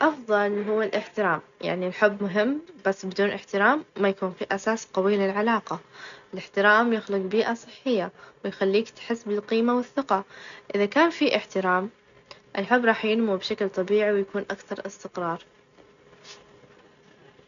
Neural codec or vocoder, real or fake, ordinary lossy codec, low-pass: none; real; none; 7.2 kHz